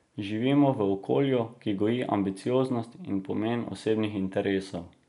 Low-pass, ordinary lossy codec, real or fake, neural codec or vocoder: 10.8 kHz; none; real; none